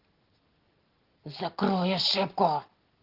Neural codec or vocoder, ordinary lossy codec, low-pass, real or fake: none; Opus, 16 kbps; 5.4 kHz; real